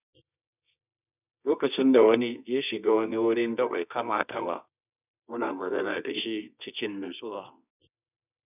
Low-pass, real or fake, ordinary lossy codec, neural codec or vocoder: 3.6 kHz; fake; none; codec, 24 kHz, 0.9 kbps, WavTokenizer, medium music audio release